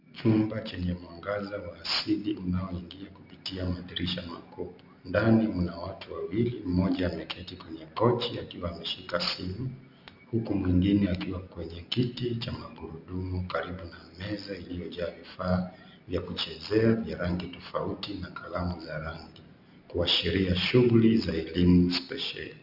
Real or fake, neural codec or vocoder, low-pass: real; none; 5.4 kHz